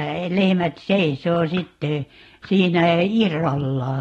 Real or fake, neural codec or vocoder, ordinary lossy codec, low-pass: real; none; AAC, 32 kbps; 19.8 kHz